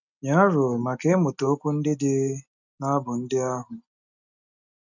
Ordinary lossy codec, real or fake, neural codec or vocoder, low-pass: none; real; none; 7.2 kHz